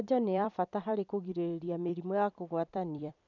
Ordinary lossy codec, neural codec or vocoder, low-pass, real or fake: none; vocoder, 44.1 kHz, 128 mel bands every 256 samples, BigVGAN v2; 7.2 kHz; fake